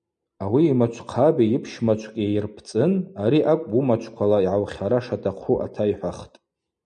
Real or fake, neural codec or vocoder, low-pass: real; none; 9.9 kHz